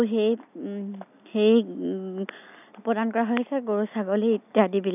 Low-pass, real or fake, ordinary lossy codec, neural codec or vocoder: 3.6 kHz; real; none; none